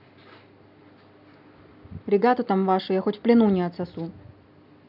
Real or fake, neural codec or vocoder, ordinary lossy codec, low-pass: real; none; none; 5.4 kHz